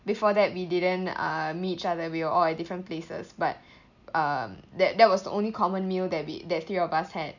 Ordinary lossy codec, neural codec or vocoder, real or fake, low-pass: none; none; real; 7.2 kHz